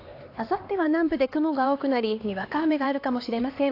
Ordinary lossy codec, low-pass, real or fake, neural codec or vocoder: AAC, 32 kbps; 5.4 kHz; fake; codec, 16 kHz, 2 kbps, X-Codec, HuBERT features, trained on LibriSpeech